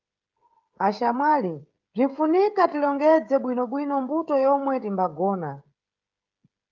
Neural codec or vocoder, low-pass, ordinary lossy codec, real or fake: codec, 16 kHz, 16 kbps, FreqCodec, smaller model; 7.2 kHz; Opus, 32 kbps; fake